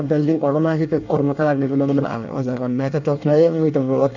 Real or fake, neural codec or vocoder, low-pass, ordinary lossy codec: fake; codec, 24 kHz, 1 kbps, SNAC; 7.2 kHz; none